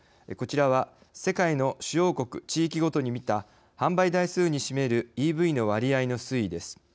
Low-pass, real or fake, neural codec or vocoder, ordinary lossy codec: none; real; none; none